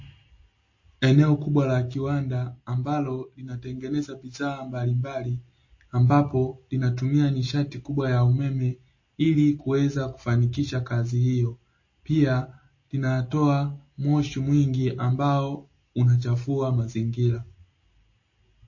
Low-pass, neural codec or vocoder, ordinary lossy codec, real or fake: 7.2 kHz; none; MP3, 32 kbps; real